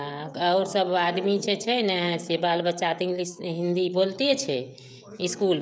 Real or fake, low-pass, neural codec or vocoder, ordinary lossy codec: fake; none; codec, 16 kHz, 16 kbps, FreqCodec, smaller model; none